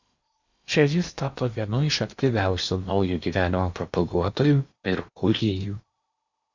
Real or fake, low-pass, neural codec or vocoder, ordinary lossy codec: fake; 7.2 kHz; codec, 16 kHz in and 24 kHz out, 0.8 kbps, FocalCodec, streaming, 65536 codes; Opus, 64 kbps